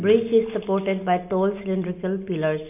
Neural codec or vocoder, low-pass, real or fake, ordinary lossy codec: none; 3.6 kHz; real; none